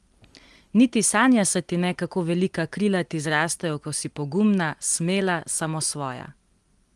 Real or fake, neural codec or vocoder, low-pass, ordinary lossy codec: real; none; 10.8 kHz; Opus, 24 kbps